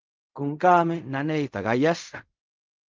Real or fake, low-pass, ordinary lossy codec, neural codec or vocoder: fake; 7.2 kHz; Opus, 24 kbps; codec, 16 kHz in and 24 kHz out, 0.4 kbps, LongCat-Audio-Codec, fine tuned four codebook decoder